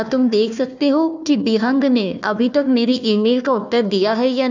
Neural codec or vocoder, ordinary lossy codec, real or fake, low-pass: codec, 16 kHz, 1 kbps, FunCodec, trained on Chinese and English, 50 frames a second; none; fake; 7.2 kHz